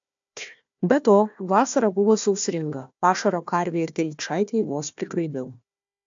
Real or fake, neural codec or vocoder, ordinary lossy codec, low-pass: fake; codec, 16 kHz, 1 kbps, FunCodec, trained on Chinese and English, 50 frames a second; AAC, 64 kbps; 7.2 kHz